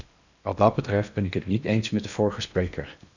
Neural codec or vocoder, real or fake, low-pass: codec, 16 kHz in and 24 kHz out, 0.8 kbps, FocalCodec, streaming, 65536 codes; fake; 7.2 kHz